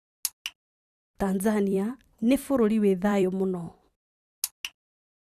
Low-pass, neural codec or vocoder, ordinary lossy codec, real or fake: 14.4 kHz; vocoder, 44.1 kHz, 128 mel bands every 256 samples, BigVGAN v2; Opus, 64 kbps; fake